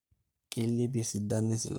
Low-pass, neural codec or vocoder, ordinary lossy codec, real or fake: none; codec, 44.1 kHz, 3.4 kbps, Pupu-Codec; none; fake